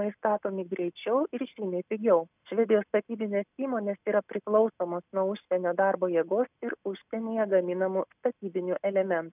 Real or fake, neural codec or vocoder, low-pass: real; none; 3.6 kHz